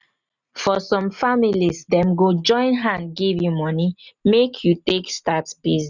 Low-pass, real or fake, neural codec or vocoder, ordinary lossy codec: 7.2 kHz; real; none; none